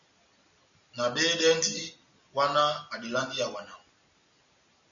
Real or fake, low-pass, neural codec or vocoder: real; 7.2 kHz; none